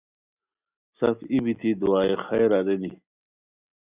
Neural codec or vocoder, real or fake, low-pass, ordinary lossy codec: none; real; 3.6 kHz; Opus, 64 kbps